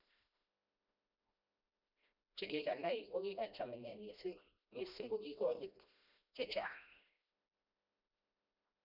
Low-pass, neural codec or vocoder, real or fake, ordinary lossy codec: 5.4 kHz; codec, 16 kHz, 1 kbps, FreqCodec, smaller model; fake; none